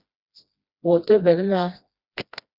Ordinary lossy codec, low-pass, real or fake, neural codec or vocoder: Opus, 64 kbps; 5.4 kHz; fake; codec, 16 kHz, 1 kbps, FreqCodec, smaller model